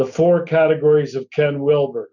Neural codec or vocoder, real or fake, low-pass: none; real; 7.2 kHz